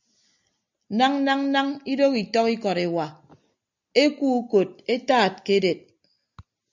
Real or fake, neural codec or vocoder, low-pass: real; none; 7.2 kHz